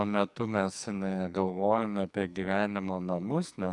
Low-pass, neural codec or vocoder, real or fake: 10.8 kHz; codec, 44.1 kHz, 2.6 kbps, SNAC; fake